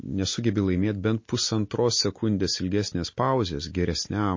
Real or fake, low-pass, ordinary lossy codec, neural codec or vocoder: real; 7.2 kHz; MP3, 32 kbps; none